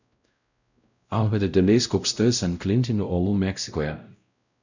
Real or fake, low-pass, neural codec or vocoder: fake; 7.2 kHz; codec, 16 kHz, 0.5 kbps, X-Codec, WavLM features, trained on Multilingual LibriSpeech